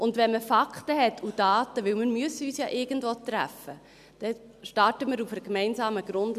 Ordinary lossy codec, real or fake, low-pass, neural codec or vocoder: MP3, 96 kbps; real; 14.4 kHz; none